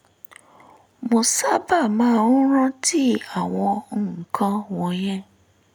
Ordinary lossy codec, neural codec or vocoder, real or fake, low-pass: none; none; real; none